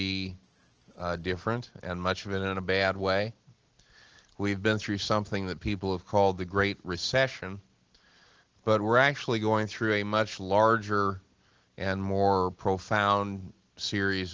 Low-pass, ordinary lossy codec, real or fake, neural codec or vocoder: 7.2 kHz; Opus, 16 kbps; real; none